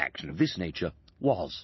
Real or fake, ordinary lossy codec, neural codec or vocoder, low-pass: real; MP3, 24 kbps; none; 7.2 kHz